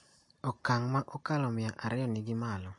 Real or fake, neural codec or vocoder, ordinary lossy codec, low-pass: real; none; MP3, 48 kbps; 10.8 kHz